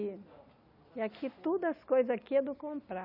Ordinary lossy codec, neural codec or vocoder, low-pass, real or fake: none; none; 5.4 kHz; real